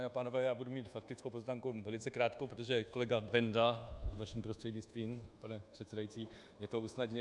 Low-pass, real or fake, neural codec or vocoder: 10.8 kHz; fake; codec, 24 kHz, 1.2 kbps, DualCodec